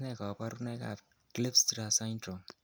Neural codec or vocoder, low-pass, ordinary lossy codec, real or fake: none; none; none; real